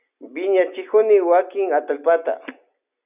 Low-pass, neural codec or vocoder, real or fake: 3.6 kHz; none; real